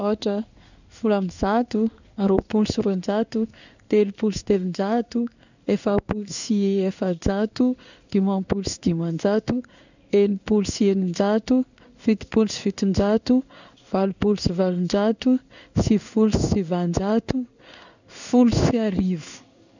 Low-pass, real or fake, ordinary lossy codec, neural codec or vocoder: 7.2 kHz; fake; none; codec, 16 kHz in and 24 kHz out, 1 kbps, XY-Tokenizer